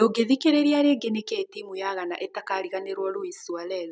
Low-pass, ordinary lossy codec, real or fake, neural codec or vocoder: none; none; real; none